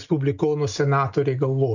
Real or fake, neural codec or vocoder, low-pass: real; none; 7.2 kHz